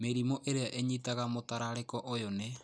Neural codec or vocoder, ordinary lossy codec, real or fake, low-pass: none; none; real; none